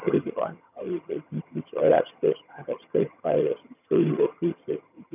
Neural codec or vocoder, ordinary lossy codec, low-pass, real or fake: vocoder, 22.05 kHz, 80 mel bands, HiFi-GAN; none; 3.6 kHz; fake